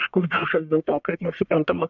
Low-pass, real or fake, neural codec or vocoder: 7.2 kHz; fake; codec, 44.1 kHz, 1.7 kbps, Pupu-Codec